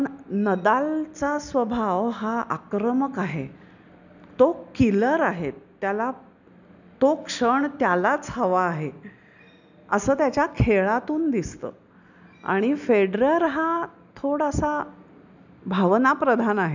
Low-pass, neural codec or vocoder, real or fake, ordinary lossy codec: 7.2 kHz; none; real; none